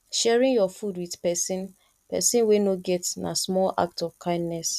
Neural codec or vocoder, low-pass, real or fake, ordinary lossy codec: none; 14.4 kHz; real; none